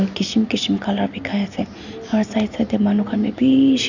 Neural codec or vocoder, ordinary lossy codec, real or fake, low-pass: none; none; real; 7.2 kHz